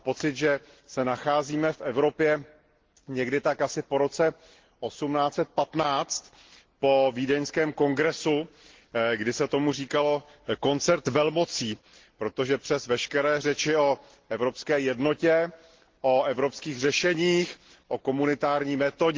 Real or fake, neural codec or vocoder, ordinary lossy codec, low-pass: real; none; Opus, 16 kbps; 7.2 kHz